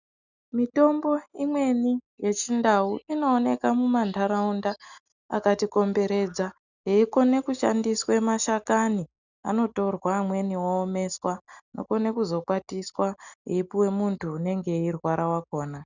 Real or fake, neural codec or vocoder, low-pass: real; none; 7.2 kHz